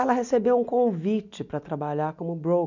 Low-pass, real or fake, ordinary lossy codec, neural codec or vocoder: 7.2 kHz; real; none; none